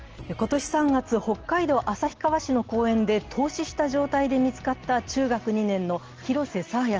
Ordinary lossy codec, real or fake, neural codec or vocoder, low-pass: Opus, 16 kbps; real; none; 7.2 kHz